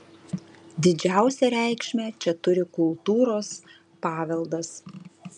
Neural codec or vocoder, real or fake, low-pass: none; real; 9.9 kHz